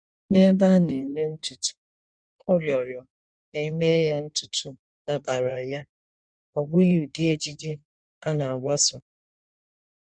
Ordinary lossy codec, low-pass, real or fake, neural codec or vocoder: none; 9.9 kHz; fake; codec, 16 kHz in and 24 kHz out, 1.1 kbps, FireRedTTS-2 codec